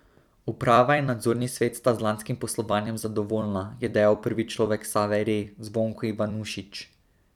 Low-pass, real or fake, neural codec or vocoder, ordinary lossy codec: 19.8 kHz; fake; vocoder, 44.1 kHz, 128 mel bands every 256 samples, BigVGAN v2; none